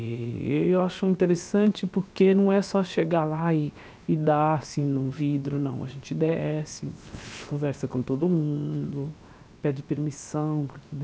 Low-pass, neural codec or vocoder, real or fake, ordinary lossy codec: none; codec, 16 kHz, 0.7 kbps, FocalCodec; fake; none